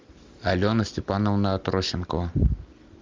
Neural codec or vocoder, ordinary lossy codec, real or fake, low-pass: none; Opus, 32 kbps; real; 7.2 kHz